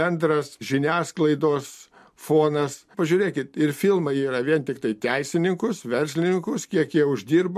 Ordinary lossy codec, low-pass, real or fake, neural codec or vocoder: MP3, 64 kbps; 14.4 kHz; real; none